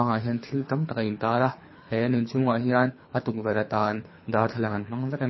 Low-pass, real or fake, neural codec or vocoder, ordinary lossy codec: 7.2 kHz; fake; codec, 24 kHz, 3 kbps, HILCodec; MP3, 24 kbps